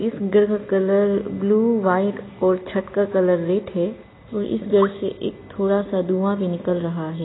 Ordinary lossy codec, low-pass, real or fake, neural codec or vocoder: AAC, 16 kbps; 7.2 kHz; real; none